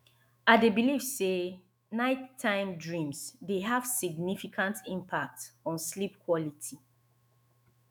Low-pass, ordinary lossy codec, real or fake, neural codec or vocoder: none; none; fake; autoencoder, 48 kHz, 128 numbers a frame, DAC-VAE, trained on Japanese speech